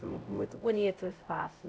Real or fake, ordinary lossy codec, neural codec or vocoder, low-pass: fake; none; codec, 16 kHz, 0.5 kbps, X-Codec, HuBERT features, trained on LibriSpeech; none